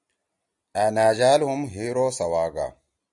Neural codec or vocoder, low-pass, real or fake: none; 10.8 kHz; real